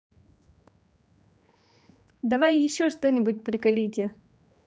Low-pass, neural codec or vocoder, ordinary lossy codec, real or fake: none; codec, 16 kHz, 2 kbps, X-Codec, HuBERT features, trained on general audio; none; fake